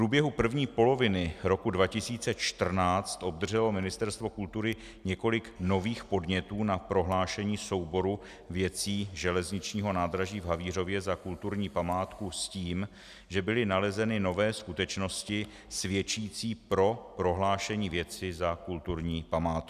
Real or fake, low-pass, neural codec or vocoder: real; 14.4 kHz; none